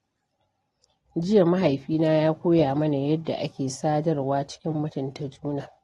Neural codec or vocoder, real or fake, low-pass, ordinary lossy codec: none; real; 19.8 kHz; AAC, 32 kbps